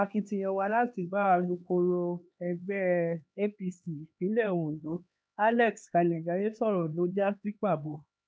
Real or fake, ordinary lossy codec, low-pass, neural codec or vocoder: fake; none; none; codec, 16 kHz, 2 kbps, X-Codec, HuBERT features, trained on LibriSpeech